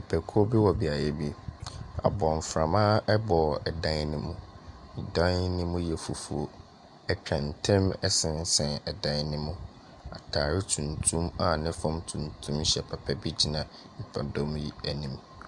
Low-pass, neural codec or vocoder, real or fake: 10.8 kHz; none; real